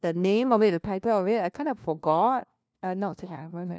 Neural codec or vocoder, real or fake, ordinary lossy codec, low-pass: codec, 16 kHz, 1 kbps, FunCodec, trained on LibriTTS, 50 frames a second; fake; none; none